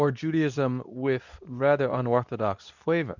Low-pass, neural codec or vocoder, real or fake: 7.2 kHz; codec, 24 kHz, 0.9 kbps, WavTokenizer, medium speech release version 2; fake